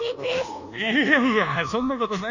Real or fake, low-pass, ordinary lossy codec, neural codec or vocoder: fake; 7.2 kHz; none; codec, 24 kHz, 1.2 kbps, DualCodec